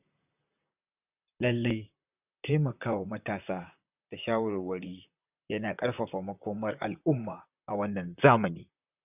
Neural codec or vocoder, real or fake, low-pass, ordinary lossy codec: vocoder, 44.1 kHz, 128 mel bands, Pupu-Vocoder; fake; 3.6 kHz; none